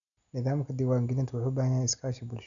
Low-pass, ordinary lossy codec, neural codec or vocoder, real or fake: 7.2 kHz; none; none; real